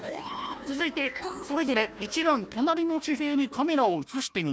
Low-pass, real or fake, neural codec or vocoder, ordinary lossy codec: none; fake; codec, 16 kHz, 1 kbps, FunCodec, trained on Chinese and English, 50 frames a second; none